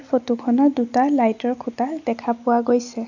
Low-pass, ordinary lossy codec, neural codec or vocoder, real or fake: 7.2 kHz; none; none; real